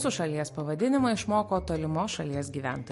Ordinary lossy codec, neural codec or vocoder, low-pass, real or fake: MP3, 48 kbps; none; 14.4 kHz; real